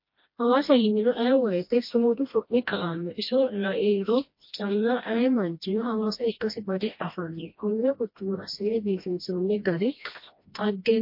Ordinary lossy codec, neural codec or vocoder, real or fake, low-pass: MP3, 32 kbps; codec, 16 kHz, 1 kbps, FreqCodec, smaller model; fake; 5.4 kHz